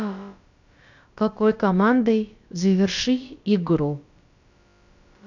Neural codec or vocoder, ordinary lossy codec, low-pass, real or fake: codec, 16 kHz, about 1 kbps, DyCAST, with the encoder's durations; none; 7.2 kHz; fake